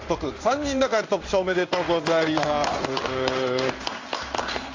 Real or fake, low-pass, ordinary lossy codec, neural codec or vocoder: fake; 7.2 kHz; AAC, 48 kbps; codec, 16 kHz in and 24 kHz out, 1 kbps, XY-Tokenizer